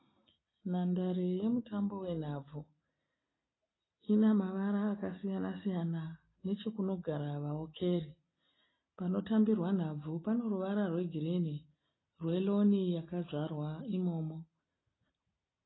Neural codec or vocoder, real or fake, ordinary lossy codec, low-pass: none; real; AAC, 16 kbps; 7.2 kHz